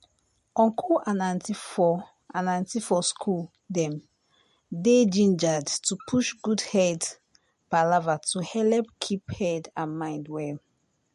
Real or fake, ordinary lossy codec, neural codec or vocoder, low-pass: real; MP3, 48 kbps; none; 14.4 kHz